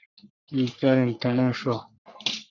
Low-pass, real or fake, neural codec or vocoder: 7.2 kHz; fake; codec, 44.1 kHz, 3.4 kbps, Pupu-Codec